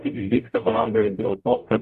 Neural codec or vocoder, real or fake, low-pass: codec, 44.1 kHz, 0.9 kbps, DAC; fake; 14.4 kHz